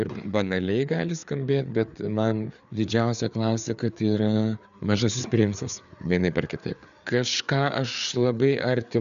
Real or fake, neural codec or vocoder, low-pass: fake; codec, 16 kHz, 4 kbps, FreqCodec, larger model; 7.2 kHz